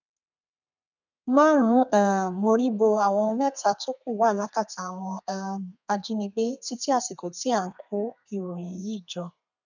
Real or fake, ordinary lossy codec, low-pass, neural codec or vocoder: fake; none; 7.2 kHz; codec, 32 kHz, 1.9 kbps, SNAC